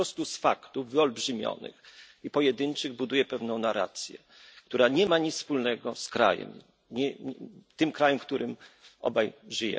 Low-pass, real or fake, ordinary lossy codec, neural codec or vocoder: none; real; none; none